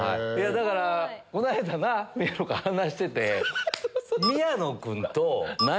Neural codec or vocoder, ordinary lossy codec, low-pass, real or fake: none; none; none; real